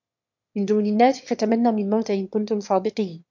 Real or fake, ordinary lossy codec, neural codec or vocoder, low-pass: fake; MP3, 48 kbps; autoencoder, 22.05 kHz, a latent of 192 numbers a frame, VITS, trained on one speaker; 7.2 kHz